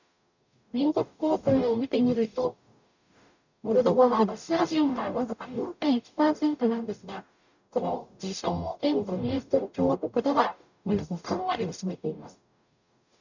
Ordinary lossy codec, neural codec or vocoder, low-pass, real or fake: none; codec, 44.1 kHz, 0.9 kbps, DAC; 7.2 kHz; fake